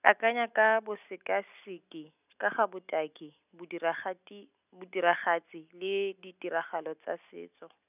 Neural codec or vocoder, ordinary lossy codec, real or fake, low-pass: none; none; real; 3.6 kHz